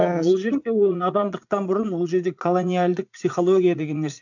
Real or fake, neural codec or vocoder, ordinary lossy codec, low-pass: fake; vocoder, 44.1 kHz, 80 mel bands, Vocos; none; 7.2 kHz